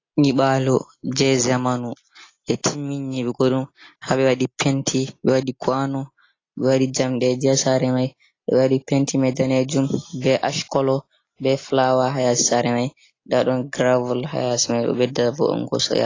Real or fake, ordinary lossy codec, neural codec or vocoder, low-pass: real; AAC, 32 kbps; none; 7.2 kHz